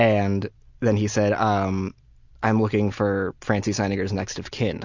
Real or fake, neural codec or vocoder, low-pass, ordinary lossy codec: real; none; 7.2 kHz; Opus, 64 kbps